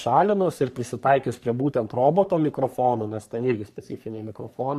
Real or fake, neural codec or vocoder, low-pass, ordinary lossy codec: fake; codec, 44.1 kHz, 3.4 kbps, Pupu-Codec; 14.4 kHz; AAC, 96 kbps